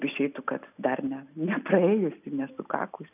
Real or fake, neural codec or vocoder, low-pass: real; none; 3.6 kHz